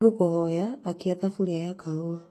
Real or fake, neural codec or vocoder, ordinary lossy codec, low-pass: fake; codec, 44.1 kHz, 2.6 kbps, SNAC; AAC, 48 kbps; 14.4 kHz